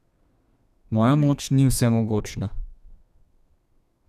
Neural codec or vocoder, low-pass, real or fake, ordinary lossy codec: codec, 32 kHz, 1.9 kbps, SNAC; 14.4 kHz; fake; none